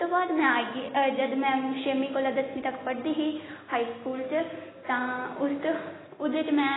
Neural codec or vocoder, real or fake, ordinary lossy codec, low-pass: none; real; AAC, 16 kbps; 7.2 kHz